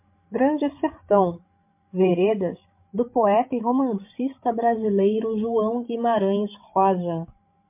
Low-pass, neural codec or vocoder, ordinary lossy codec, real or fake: 3.6 kHz; codec, 16 kHz, 16 kbps, FreqCodec, larger model; MP3, 32 kbps; fake